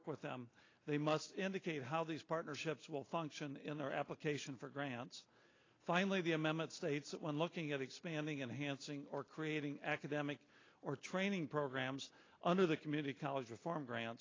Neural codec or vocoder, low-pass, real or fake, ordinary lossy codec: none; 7.2 kHz; real; AAC, 32 kbps